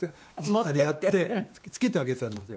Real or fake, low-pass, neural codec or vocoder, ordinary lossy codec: fake; none; codec, 16 kHz, 2 kbps, X-Codec, WavLM features, trained on Multilingual LibriSpeech; none